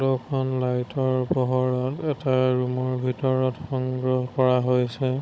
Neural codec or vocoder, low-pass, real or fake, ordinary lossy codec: codec, 16 kHz, 16 kbps, FunCodec, trained on Chinese and English, 50 frames a second; none; fake; none